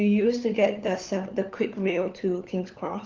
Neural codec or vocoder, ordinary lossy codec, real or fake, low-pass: codec, 16 kHz, 4 kbps, FunCodec, trained on LibriTTS, 50 frames a second; Opus, 32 kbps; fake; 7.2 kHz